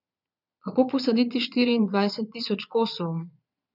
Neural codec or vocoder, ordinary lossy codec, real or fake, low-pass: vocoder, 22.05 kHz, 80 mel bands, Vocos; none; fake; 5.4 kHz